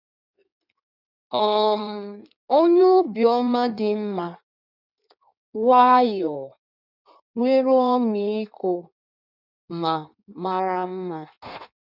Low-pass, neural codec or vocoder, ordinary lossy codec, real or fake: 5.4 kHz; codec, 16 kHz in and 24 kHz out, 1.1 kbps, FireRedTTS-2 codec; none; fake